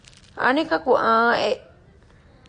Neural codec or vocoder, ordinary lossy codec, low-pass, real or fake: none; MP3, 48 kbps; 9.9 kHz; real